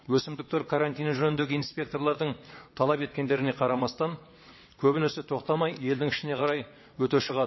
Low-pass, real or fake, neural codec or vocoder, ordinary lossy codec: 7.2 kHz; fake; vocoder, 22.05 kHz, 80 mel bands, WaveNeXt; MP3, 24 kbps